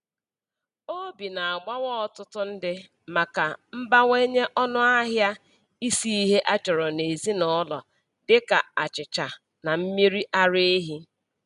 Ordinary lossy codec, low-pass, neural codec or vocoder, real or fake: none; 10.8 kHz; none; real